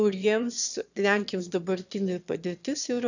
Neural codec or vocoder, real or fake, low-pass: autoencoder, 22.05 kHz, a latent of 192 numbers a frame, VITS, trained on one speaker; fake; 7.2 kHz